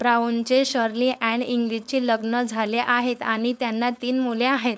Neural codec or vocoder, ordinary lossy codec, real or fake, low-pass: codec, 16 kHz, 4.8 kbps, FACodec; none; fake; none